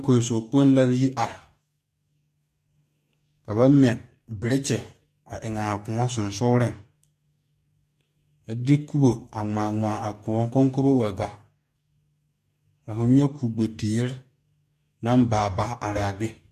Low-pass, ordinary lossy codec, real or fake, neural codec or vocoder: 14.4 kHz; MP3, 64 kbps; fake; codec, 44.1 kHz, 2.6 kbps, DAC